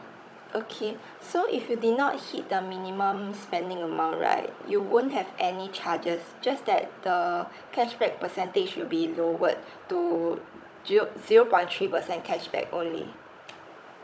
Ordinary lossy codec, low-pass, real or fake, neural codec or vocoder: none; none; fake; codec, 16 kHz, 16 kbps, FunCodec, trained on Chinese and English, 50 frames a second